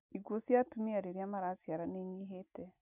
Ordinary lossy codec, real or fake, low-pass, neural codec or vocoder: none; real; 3.6 kHz; none